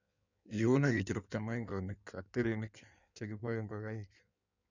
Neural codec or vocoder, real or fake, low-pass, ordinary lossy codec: codec, 16 kHz in and 24 kHz out, 1.1 kbps, FireRedTTS-2 codec; fake; 7.2 kHz; none